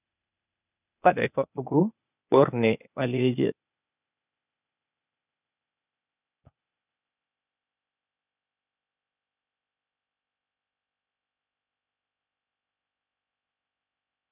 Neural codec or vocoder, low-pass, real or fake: codec, 16 kHz, 0.8 kbps, ZipCodec; 3.6 kHz; fake